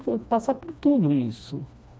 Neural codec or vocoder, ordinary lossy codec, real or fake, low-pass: codec, 16 kHz, 2 kbps, FreqCodec, smaller model; none; fake; none